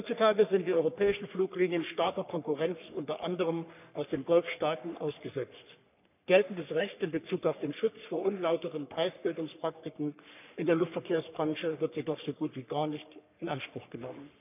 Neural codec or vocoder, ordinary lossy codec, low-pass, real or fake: codec, 44.1 kHz, 3.4 kbps, Pupu-Codec; none; 3.6 kHz; fake